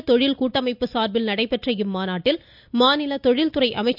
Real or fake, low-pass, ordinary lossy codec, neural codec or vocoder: real; 5.4 kHz; none; none